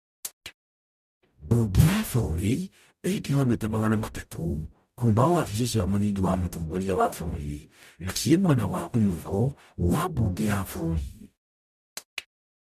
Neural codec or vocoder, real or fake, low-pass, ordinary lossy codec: codec, 44.1 kHz, 0.9 kbps, DAC; fake; 14.4 kHz; none